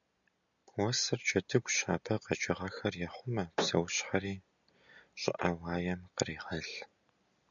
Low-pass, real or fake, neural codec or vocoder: 7.2 kHz; real; none